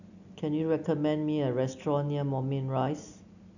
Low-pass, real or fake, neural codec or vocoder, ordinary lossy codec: 7.2 kHz; real; none; none